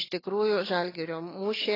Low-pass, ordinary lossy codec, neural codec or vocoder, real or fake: 5.4 kHz; AAC, 24 kbps; none; real